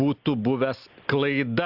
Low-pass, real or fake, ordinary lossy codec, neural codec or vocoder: 5.4 kHz; real; AAC, 48 kbps; none